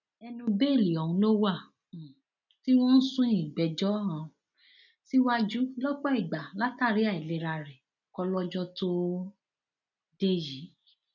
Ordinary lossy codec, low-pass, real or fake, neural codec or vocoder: none; 7.2 kHz; real; none